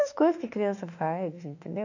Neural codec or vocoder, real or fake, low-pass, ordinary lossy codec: autoencoder, 48 kHz, 32 numbers a frame, DAC-VAE, trained on Japanese speech; fake; 7.2 kHz; none